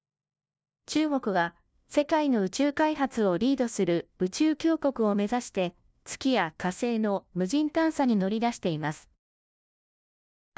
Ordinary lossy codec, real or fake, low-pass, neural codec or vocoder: none; fake; none; codec, 16 kHz, 1 kbps, FunCodec, trained on LibriTTS, 50 frames a second